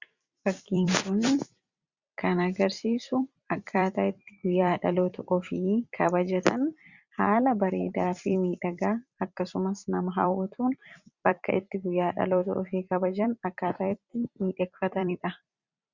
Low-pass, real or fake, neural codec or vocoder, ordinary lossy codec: 7.2 kHz; fake; vocoder, 44.1 kHz, 128 mel bands every 256 samples, BigVGAN v2; Opus, 64 kbps